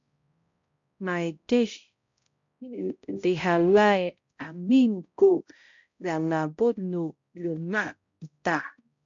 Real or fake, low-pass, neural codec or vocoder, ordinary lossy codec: fake; 7.2 kHz; codec, 16 kHz, 0.5 kbps, X-Codec, HuBERT features, trained on balanced general audio; MP3, 48 kbps